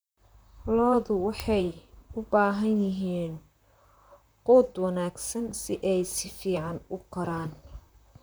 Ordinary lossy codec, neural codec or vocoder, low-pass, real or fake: none; vocoder, 44.1 kHz, 128 mel bands, Pupu-Vocoder; none; fake